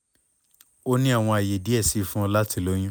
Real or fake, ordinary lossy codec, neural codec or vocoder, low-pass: real; none; none; none